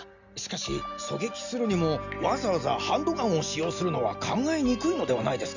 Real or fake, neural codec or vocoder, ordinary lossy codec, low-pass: real; none; none; 7.2 kHz